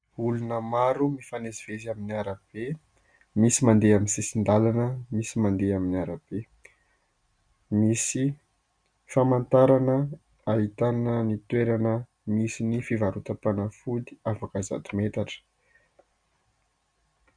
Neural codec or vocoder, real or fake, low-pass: none; real; 9.9 kHz